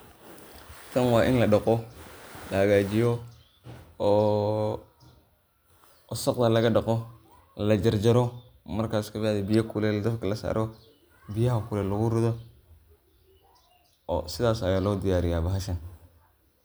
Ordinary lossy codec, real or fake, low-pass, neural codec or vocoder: none; real; none; none